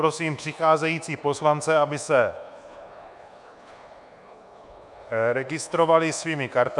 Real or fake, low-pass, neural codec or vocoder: fake; 10.8 kHz; codec, 24 kHz, 1.2 kbps, DualCodec